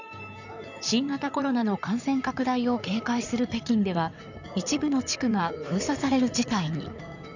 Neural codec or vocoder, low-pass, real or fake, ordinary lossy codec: codec, 16 kHz in and 24 kHz out, 2.2 kbps, FireRedTTS-2 codec; 7.2 kHz; fake; none